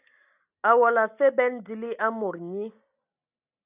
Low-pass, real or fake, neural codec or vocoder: 3.6 kHz; real; none